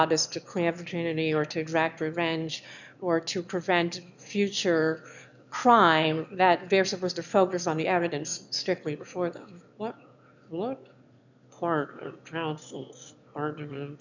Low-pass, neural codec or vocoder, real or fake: 7.2 kHz; autoencoder, 22.05 kHz, a latent of 192 numbers a frame, VITS, trained on one speaker; fake